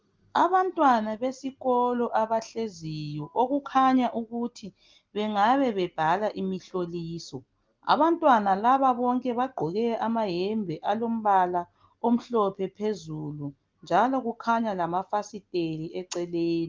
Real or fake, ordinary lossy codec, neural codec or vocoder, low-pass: real; Opus, 32 kbps; none; 7.2 kHz